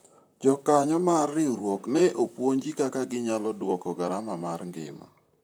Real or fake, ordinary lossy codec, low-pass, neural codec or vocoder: fake; none; none; vocoder, 44.1 kHz, 128 mel bands, Pupu-Vocoder